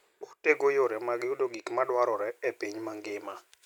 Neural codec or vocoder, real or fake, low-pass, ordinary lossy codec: none; real; 19.8 kHz; none